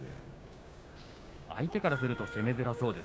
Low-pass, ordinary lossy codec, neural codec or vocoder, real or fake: none; none; codec, 16 kHz, 6 kbps, DAC; fake